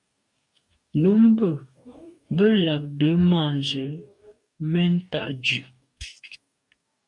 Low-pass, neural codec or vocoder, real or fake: 10.8 kHz; codec, 44.1 kHz, 2.6 kbps, DAC; fake